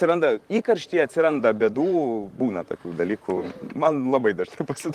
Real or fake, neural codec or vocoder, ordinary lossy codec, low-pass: fake; vocoder, 44.1 kHz, 128 mel bands, Pupu-Vocoder; Opus, 24 kbps; 14.4 kHz